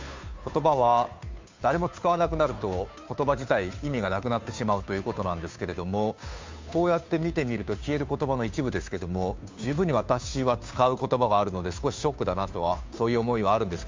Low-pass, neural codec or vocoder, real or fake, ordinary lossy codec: 7.2 kHz; codec, 16 kHz, 2 kbps, FunCodec, trained on Chinese and English, 25 frames a second; fake; MP3, 64 kbps